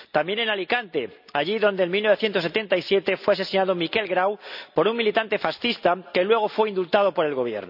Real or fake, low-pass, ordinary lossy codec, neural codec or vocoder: real; 5.4 kHz; none; none